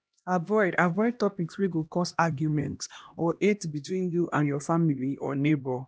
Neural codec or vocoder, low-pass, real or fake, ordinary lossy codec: codec, 16 kHz, 1 kbps, X-Codec, HuBERT features, trained on LibriSpeech; none; fake; none